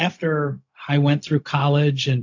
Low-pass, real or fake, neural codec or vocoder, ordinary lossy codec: 7.2 kHz; fake; codec, 16 kHz, 0.4 kbps, LongCat-Audio-Codec; AAC, 48 kbps